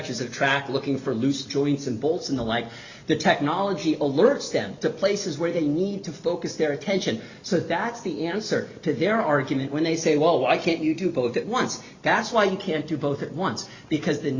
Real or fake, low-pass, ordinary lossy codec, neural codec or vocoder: real; 7.2 kHz; Opus, 64 kbps; none